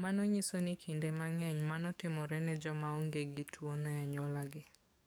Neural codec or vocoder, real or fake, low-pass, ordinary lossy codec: codec, 44.1 kHz, 7.8 kbps, DAC; fake; none; none